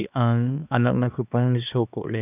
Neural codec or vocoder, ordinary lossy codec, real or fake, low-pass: codec, 16 kHz, 2 kbps, X-Codec, HuBERT features, trained on balanced general audio; none; fake; 3.6 kHz